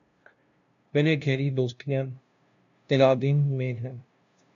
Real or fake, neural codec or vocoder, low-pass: fake; codec, 16 kHz, 0.5 kbps, FunCodec, trained on LibriTTS, 25 frames a second; 7.2 kHz